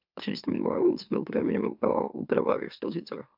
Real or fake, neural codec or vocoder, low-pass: fake; autoencoder, 44.1 kHz, a latent of 192 numbers a frame, MeloTTS; 5.4 kHz